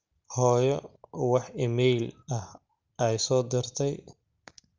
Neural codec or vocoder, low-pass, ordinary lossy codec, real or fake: none; 7.2 kHz; Opus, 24 kbps; real